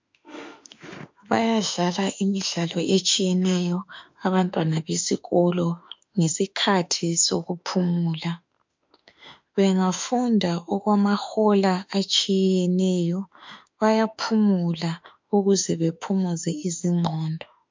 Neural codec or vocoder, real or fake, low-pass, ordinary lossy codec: autoencoder, 48 kHz, 32 numbers a frame, DAC-VAE, trained on Japanese speech; fake; 7.2 kHz; MP3, 64 kbps